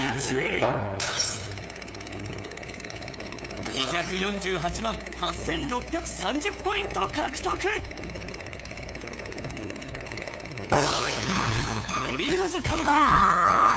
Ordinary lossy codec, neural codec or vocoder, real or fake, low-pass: none; codec, 16 kHz, 2 kbps, FunCodec, trained on LibriTTS, 25 frames a second; fake; none